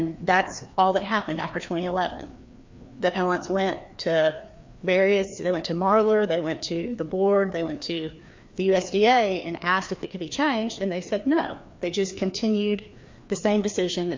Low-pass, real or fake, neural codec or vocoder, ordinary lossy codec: 7.2 kHz; fake; codec, 16 kHz, 2 kbps, FreqCodec, larger model; MP3, 48 kbps